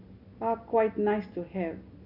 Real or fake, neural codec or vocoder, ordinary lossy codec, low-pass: real; none; none; 5.4 kHz